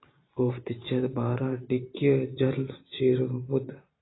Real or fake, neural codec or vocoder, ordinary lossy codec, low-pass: fake; vocoder, 44.1 kHz, 128 mel bands every 512 samples, BigVGAN v2; AAC, 16 kbps; 7.2 kHz